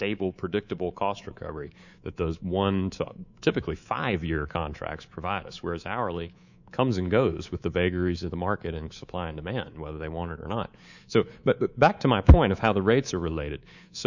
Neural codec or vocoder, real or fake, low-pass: codec, 24 kHz, 3.1 kbps, DualCodec; fake; 7.2 kHz